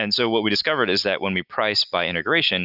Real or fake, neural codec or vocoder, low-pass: real; none; 5.4 kHz